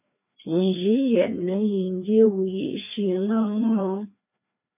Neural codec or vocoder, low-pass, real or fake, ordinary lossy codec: codec, 16 kHz, 2 kbps, FreqCodec, larger model; 3.6 kHz; fake; MP3, 32 kbps